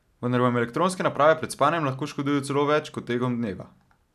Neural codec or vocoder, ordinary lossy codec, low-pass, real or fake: none; none; 14.4 kHz; real